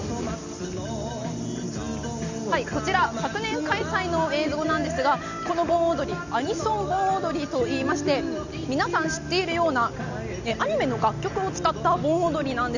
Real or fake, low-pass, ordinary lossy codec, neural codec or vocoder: real; 7.2 kHz; none; none